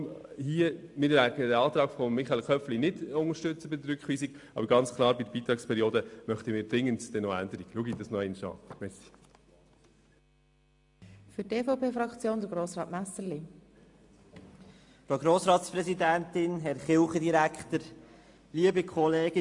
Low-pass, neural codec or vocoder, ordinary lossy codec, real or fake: 10.8 kHz; none; AAC, 64 kbps; real